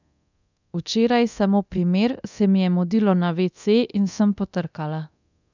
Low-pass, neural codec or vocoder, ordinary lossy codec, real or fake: 7.2 kHz; codec, 24 kHz, 0.9 kbps, DualCodec; none; fake